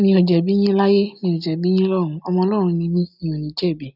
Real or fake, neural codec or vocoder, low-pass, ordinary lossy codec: real; none; 5.4 kHz; none